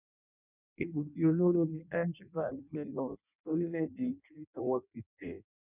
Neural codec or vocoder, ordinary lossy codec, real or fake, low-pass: codec, 16 kHz in and 24 kHz out, 0.6 kbps, FireRedTTS-2 codec; none; fake; 3.6 kHz